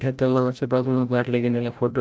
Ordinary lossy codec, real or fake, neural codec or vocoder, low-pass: none; fake; codec, 16 kHz, 0.5 kbps, FreqCodec, larger model; none